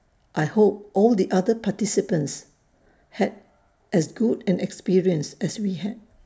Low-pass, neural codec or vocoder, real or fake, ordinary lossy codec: none; none; real; none